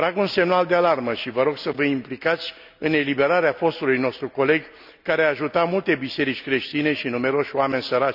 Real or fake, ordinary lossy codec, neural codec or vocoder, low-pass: real; none; none; 5.4 kHz